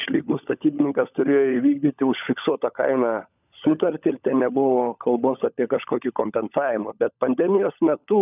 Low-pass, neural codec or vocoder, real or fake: 3.6 kHz; codec, 16 kHz, 16 kbps, FunCodec, trained on LibriTTS, 50 frames a second; fake